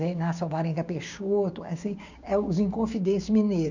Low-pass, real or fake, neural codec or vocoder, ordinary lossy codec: 7.2 kHz; fake; codec, 16 kHz, 6 kbps, DAC; none